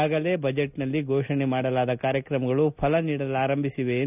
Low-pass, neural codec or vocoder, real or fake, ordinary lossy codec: 3.6 kHz; none; real; none